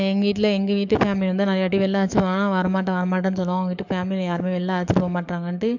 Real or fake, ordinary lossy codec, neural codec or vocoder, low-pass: fake; none; codec, 44.1 kHz, 7.8 kbps, DAC; 7.2 kHz